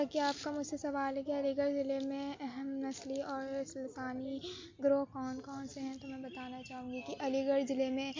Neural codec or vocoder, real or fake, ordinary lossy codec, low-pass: none; real; MP3, 48 kbps; 7.2 kHz